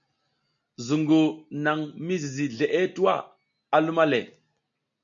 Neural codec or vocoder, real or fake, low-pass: none; real; 7.2 kHz